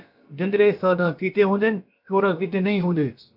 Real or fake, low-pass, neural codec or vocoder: fake; 5.4 kHz; codec, 16 kHz, about 1 kbps, DyCAST, with the encoder's durations